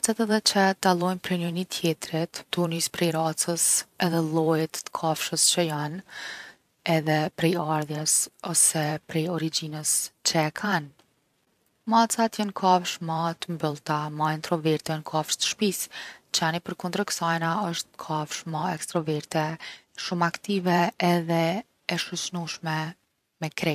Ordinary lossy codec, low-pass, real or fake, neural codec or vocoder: none; 14.4 kHz; fake; vocoder, 44.1 kHz, 128 mel bands every 512 samples, BigVGAN v2